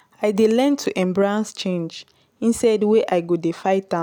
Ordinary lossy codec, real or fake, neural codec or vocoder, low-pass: none; real; none; 19.8 kHz